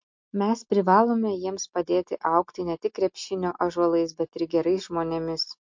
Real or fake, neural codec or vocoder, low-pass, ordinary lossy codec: real; none; 7.2 kHz; MP3, 48 kbps